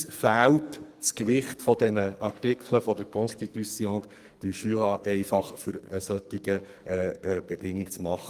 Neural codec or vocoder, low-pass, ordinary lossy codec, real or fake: codec, 44.1 kHz, 2.6 kbps, SNAC; 14.4 kHz; Opus, 32 kbps; fake